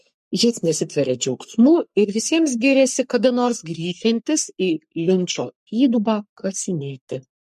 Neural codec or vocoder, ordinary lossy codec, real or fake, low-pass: codec, 44.1 kHz, 3.4 kbps, Pupu-Codec; MP3, 64 kbps; fake; 14.4 kHz